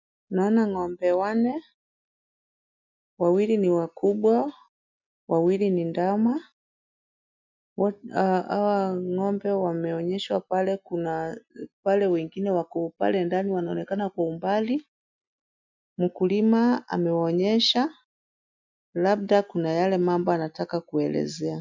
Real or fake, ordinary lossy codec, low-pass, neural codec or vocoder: real; MP3, 64 kbps; 7.2 kHz; none